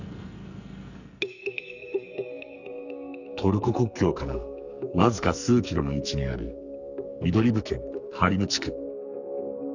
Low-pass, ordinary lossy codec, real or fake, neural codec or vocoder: 7.2 kHz; none; fake; codec, 44.1 kHz, 2.6 kbps, SNAC